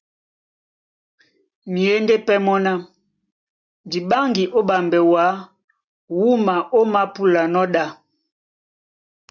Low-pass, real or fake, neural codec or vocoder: 7.2 kHz; real; none